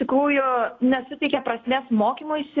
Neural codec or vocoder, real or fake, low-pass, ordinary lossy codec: none; real; 7.2 kHz; AAC, 32 kbps